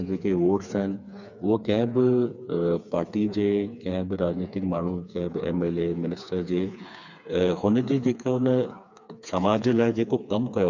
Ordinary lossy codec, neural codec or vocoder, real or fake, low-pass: none; codec, 16 kHz, 4 kbps, FreqCodec, smaller model; fake; 7.2 kHz